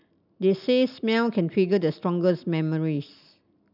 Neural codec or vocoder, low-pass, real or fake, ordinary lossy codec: none; 5.4 kHz; real; none